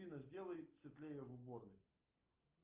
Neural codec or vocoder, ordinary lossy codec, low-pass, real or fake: none; Opus, 24 kbps; 3.6 kHz; real